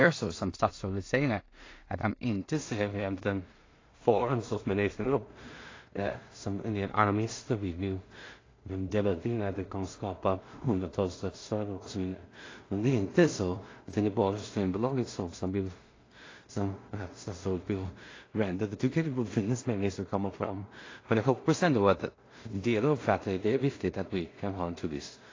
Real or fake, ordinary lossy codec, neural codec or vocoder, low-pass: fake; AAC, 32 kbps; codec, 16 kHz in and 24 kHz out, 0.4 kbps, LongCat-Audio-Codec, two codebook decoder; 7.2 kHz